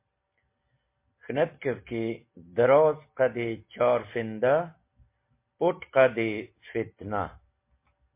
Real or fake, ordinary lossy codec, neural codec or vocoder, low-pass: real; MP3, 24 kbps; none; 3.6 kHz